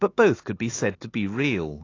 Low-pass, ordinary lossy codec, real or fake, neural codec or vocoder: 7.2 kHz; AAC, 32 kbps; fake; autoencoder, 48 kHz, 128 numbers a frame, DAC-VAE, trained on Japanese speech